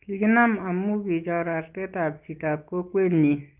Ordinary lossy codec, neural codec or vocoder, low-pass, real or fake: Opus, 32 kbps; none; 3.6 kHz; real